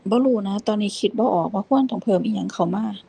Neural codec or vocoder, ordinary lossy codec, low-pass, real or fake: none; Opus, 24 kbps; 9.9 kHz; real